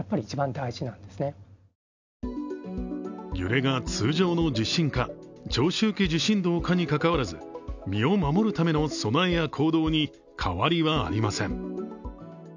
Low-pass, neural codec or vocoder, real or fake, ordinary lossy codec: 7.2 kHz; none; real; none